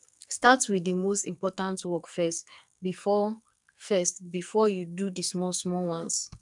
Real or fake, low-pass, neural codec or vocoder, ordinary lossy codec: fake; 10.8 kHz; codec, 44.1 kHz, 2.6 kbps, SNAC; none